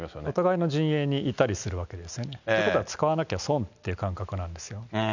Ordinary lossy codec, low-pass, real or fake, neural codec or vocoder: none; 7.2 kHz; real; none